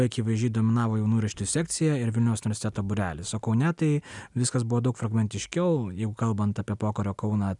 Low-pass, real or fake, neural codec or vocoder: 10.8 kHz; real; none